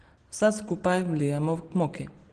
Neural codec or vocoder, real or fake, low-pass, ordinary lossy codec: none; real; 9.9 kHz; Opus, 16 kbps